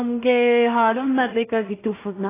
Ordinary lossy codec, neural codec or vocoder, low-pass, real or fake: AAC, 16 kbps; codec, 16 kHz in and 24 kHz out, 0.4 kbps, LongCat-Audio-Codec, two codebook decoder; 3.6 kHz; fake